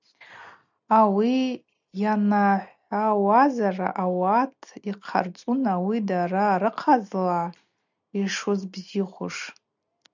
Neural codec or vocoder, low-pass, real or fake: none; 7.2 kHz; real